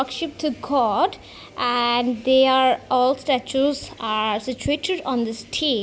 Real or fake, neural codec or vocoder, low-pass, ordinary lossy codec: real; none; none; none